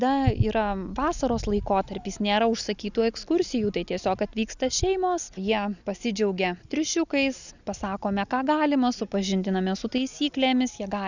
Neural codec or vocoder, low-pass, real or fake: none; 7.2 kHz; real